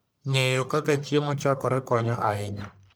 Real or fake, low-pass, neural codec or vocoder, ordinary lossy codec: fake; none; codec, 44.1 kHz, 1.7 kbps, Pupu-Codec; none